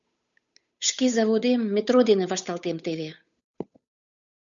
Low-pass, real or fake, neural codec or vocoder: 7.2 kHz; fake; codec, 16 kHz, 8 kbps, FunCodec, trained on Chinese and English, 25 frames a second